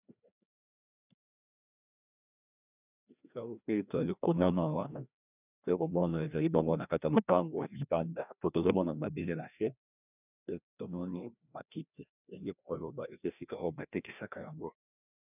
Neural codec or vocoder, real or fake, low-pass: codec, 16 kHz, 1 kbps, FreqCodec, larger model; fake; 3.6 kHz